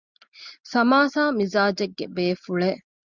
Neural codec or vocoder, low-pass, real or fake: none; 7.2 kHz; real